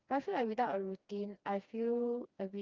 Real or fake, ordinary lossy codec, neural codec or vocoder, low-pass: fake; Opus, 24 kbps; codec, 16 kHz, 2 kbps, FreqCodec, smaller model; 7.2 kHz